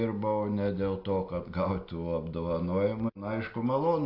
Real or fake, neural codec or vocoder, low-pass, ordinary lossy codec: real; none; 5.4 kHz; AAC, 32 kbps